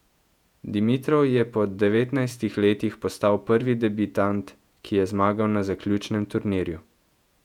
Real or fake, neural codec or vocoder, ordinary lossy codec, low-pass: fake; vocoder, 48 kHz, 128 mel bands, Vocos; none; 19.8 kHz